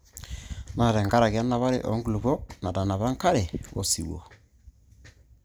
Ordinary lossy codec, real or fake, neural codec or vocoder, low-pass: none; real; none; none